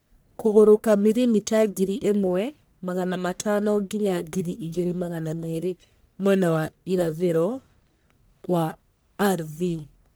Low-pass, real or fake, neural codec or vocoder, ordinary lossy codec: none; fake; codec, 44.1 kHz, 1.7 kbps, Pupu-Codec; none